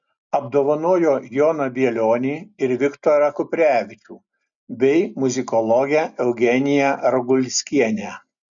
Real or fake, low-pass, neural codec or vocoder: real; 7.2 kHz; none